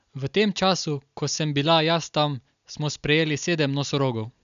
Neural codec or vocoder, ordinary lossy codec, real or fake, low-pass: none; MP3, 96 kbps; real; 7.2 kHz